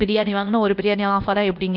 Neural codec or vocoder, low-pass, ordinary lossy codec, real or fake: codec, 16 kHz, 0.8 kbps, ZipCodec; 5.4 kHz; none; fake